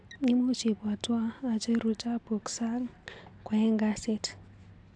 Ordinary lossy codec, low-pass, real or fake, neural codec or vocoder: AAC, 64 kbps; 9.9 kHz; real; none